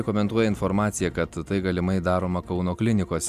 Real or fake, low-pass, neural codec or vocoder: real; 14.4 kHz; none